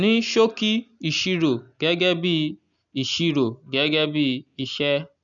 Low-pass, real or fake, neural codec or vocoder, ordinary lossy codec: 7.2 kHz; real; none; none